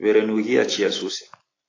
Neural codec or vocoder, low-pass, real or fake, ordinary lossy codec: none; 7.2 kHz; real; AAC, 32 kbps